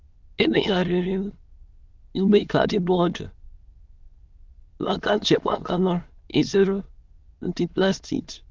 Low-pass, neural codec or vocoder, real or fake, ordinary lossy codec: 7.2 kHz; autoencoder, 22.05 kHz, a latent of 192 numbers a frame, VITS, trained on many speakers; fake; Opus, 24 kbps